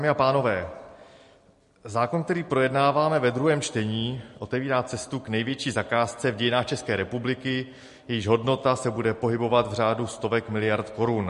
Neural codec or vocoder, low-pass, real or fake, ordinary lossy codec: none; 14.4 kHz; real; MP3, 48 kbps